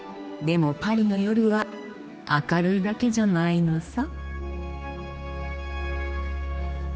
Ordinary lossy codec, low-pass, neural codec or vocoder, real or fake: none; none; codec, 16 kHz, 2 kbps, X-Codec, HuBERT features, trained on general audio; fake